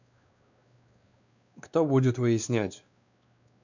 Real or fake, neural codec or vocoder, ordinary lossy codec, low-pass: fake; codec, 16 kHz, 2 kbps, X-Codec, WavLM features, trained on Multilingual LibriSpeech; none; 7.2 kHz